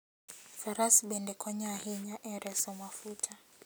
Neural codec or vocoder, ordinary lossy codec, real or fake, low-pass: none; none; real; none